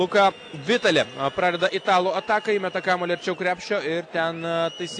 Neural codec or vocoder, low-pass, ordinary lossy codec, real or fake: none; 9.9 kHz; AAC, 48 kbps; real